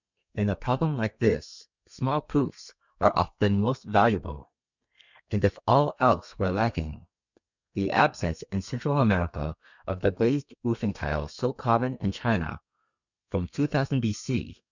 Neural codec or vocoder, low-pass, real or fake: codec, 32 kHz, 1.9 kbps, SNAC; 7.2 kHz; fake